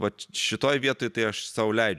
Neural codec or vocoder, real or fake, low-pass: none; real; 14.4 kHz